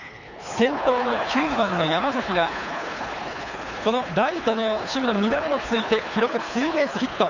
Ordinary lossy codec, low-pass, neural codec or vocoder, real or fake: none; 7.2 kHz; codec, 24 kHz, 3 kbps, HILCodec; fake